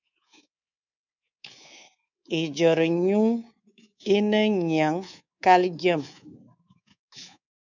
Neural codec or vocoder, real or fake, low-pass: codec, 24 kHz, 3.1 kbps, DualCodec; fake; 7.2 kHz